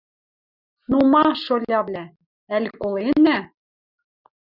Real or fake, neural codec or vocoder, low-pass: real; none; 5.4 kHz